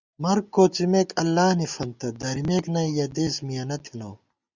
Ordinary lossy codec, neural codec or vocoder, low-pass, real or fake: Opus, 64 kbps; none; 7.2 kHz; real